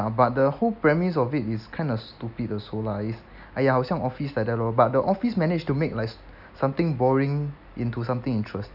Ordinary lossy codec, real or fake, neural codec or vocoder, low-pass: none; real; none; 5.4 kHz